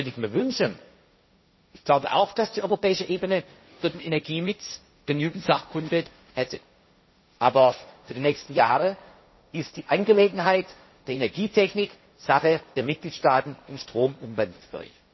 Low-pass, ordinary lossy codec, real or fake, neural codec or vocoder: 7.2 kHz; MP3, 24 kbps; fake; codec, 16 kHz, 1.1 kbps, Voila-Tokenizer